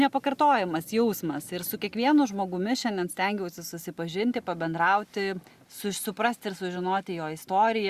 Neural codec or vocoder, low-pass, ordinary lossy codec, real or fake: none; 14.4 kHz; Opus, 64 kbps; real